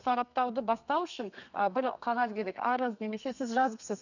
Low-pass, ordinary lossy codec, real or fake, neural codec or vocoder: 7.2 kHz; none; fake; codec, 32 kHz, 1.9 kbps, SNAC